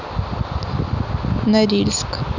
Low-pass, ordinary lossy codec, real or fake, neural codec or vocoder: 7.2 kHz; none; real; none